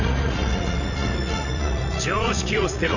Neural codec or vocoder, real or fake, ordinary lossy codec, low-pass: vocoder, 44.1 kHz, 80 mel bands, Vocos; fake; none; 7.2 kHz